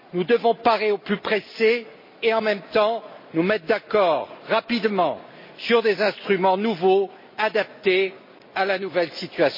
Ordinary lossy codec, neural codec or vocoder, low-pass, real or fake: MP3, 24 kbps; none; 5.4 kHz; real